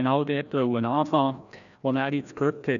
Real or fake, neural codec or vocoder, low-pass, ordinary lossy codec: fake; codec, 16 kHz, 1 kbps, FreqCodec, larger model; 7.2 kHz; MP3, 96 kbps